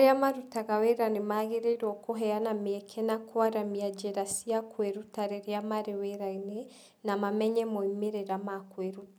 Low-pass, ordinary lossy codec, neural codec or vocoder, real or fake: none; none; none; real